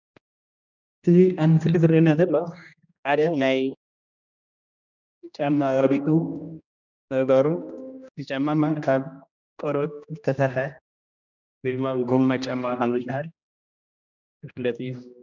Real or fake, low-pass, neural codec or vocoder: fake; 7.2 kHz; codec, 16 kHz, 1 kbps, X-Codec, HuBERT features, trained on general audio